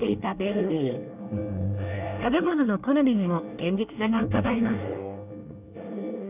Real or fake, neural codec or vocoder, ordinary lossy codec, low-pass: fake; codec, 24 kHz, 1 kbps, SNAC; none; 3.6 kHz